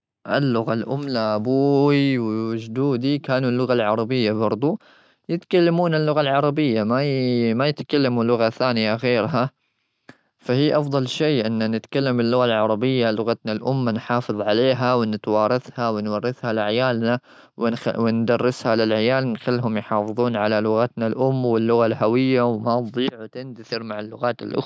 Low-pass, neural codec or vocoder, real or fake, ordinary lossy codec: none; none; real; none